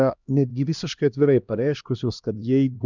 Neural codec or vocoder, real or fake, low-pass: codec, 16 kHz, 1 kbps, X-Codec, HuBERT features, trained on LibriSpeech; fake; 7.2 kHz